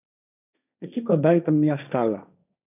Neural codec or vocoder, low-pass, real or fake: codec, 16 kHz, 1.1 kbps, Voila-Tokenizer; 3.6 kHz; fake